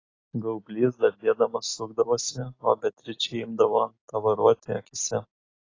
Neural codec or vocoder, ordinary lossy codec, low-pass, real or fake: none; AAC, 32 kbps; 7.2 kHz; real